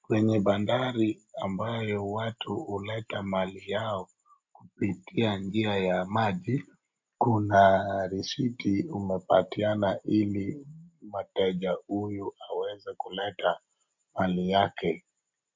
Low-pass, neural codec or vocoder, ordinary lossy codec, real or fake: 7.2 kHz; none; MP3, 48 kbps; real